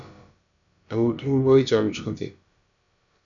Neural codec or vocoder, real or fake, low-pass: codec, 16 kHz, about 1 kbps, DyCAST, with the encoder's durations; fake; 7.2 kHz